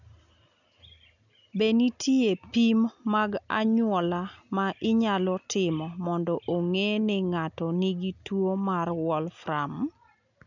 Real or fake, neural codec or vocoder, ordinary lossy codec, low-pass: real; none; none; 7.2 kHz